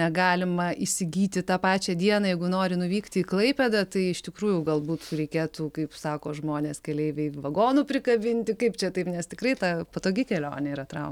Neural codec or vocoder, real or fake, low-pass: none; real; 19.8 kHz